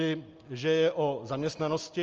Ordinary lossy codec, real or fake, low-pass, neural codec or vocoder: Opus, 24 kbps; real; 7.2 kHz; none